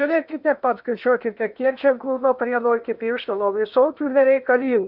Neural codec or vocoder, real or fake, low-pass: codec, 16 kHz in and 24 kHz out, 0.8 kbps, FocalCodec, streaming, 65536 codes; fake; 5.4 kHz